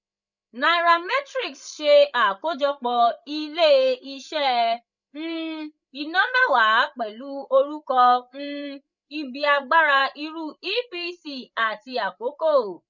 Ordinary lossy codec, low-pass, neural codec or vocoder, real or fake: none; 7.2 kHz; codec, 16 kHz, 8 kbps, FreqCodec, larger model; fake